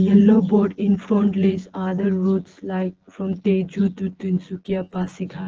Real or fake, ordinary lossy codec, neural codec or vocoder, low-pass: fake; Opus, 16 kbps; vocoder, 24 kHz, 100 mel bands, Vocos; 7.2 kHz